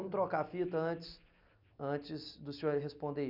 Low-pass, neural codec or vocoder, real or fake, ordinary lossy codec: 5.4 kHz; none; real; none